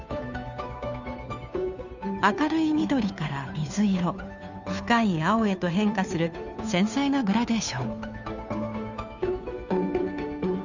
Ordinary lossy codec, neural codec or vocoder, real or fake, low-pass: none; codec, 16 kHz, 2 kbps, FunCodec, trained on Chinese and English, 25 frames a second; fake; 7.2 kHz